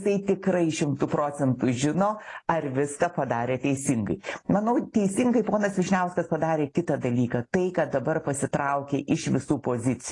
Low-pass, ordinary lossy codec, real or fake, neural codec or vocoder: 10.8 kHz; AAC, 32 kbps; real; none